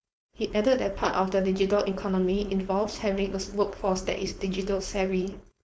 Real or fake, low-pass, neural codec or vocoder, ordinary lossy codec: fake; none; codec, 16 kHz, 4.8 kbps, FACodec; none